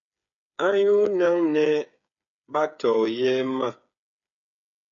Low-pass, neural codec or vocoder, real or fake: 7.2 kHz; codec, 16 kHz, 8 kbps, FreqCodec, smaller model; fake